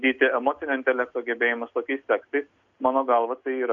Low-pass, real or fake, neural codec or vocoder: 7.2 kHz; real; none